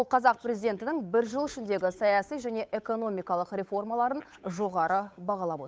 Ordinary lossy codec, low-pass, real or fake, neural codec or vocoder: none; none; fake; codec, 16 kHz, 8 kbps, FunCodec, trained on Chinese and English, 25 frames a second